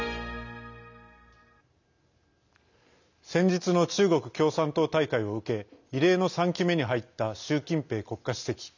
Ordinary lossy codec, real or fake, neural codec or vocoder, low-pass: none; real; none; 7.2 kHz